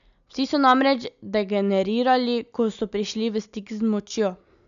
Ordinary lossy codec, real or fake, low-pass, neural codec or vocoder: none; real; 7.2 kHz; none